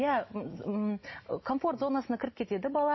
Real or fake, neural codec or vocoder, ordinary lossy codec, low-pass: real; none; MP3, 24 kbps; 7.2 kHz